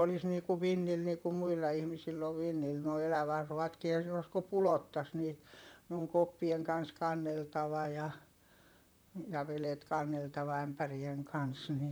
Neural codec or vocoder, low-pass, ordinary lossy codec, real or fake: vocoder, 44.1 kHz, 128 mel bands, Pupu-Vocoder; none; none; fake